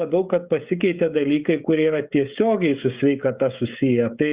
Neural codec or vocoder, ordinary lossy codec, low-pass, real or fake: codec, 16 kHz, 16 kbps, FreqCodec, smaller model; Opus, 64 kbps; 3.6 kHz; fake